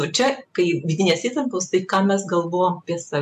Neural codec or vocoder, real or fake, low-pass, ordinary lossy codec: none; real; 14.4 kHz; AAC, 96 kbps